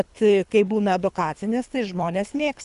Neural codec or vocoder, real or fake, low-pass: codec, 24 kHz, 3 kbps, HILCodec; fake; 10.8 kHz